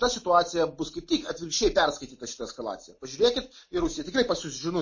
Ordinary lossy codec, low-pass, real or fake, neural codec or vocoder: MP3, 32 kbps; 7.2 kHz; real; none